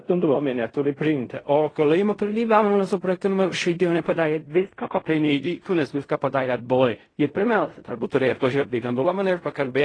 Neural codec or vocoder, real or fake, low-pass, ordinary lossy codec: codec, 16 kHz in and 24 kHz out, 0.4 kbps, LongCat-Audio-Codec, fine tuned four codebook decoder; fake; 9.9 kHz; AAC, 32 kbps